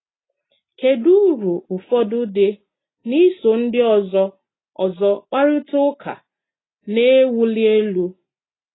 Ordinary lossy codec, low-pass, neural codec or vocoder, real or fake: AAC, 16 kbps; 7.2 kHz; none; real